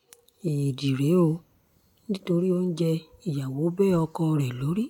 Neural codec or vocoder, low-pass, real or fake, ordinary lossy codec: none; 19.8 kHz; real; none